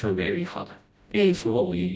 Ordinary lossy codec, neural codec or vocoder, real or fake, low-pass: none; codec, 16 kHz, 0.5 kbps, FreqCodec, smaller model; fake; none